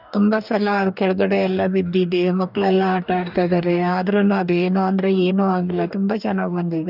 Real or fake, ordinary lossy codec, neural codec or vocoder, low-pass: fake; none; codec, 44.1 kHz, 2.6 kbps, DAC; 5.4 kHz